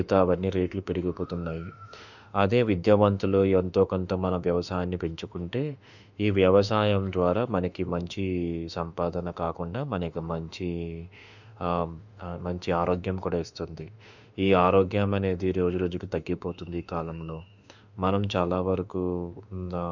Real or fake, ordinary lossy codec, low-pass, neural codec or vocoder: fake; none; 7.2 kHz; autoencoder, 48 kHz, 32 numbers a frame, DAC-VAE, trained on Japanese speech